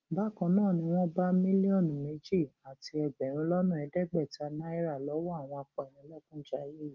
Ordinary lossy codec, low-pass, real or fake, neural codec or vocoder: Opus, 24 kbps; 7.2 kHz; real; none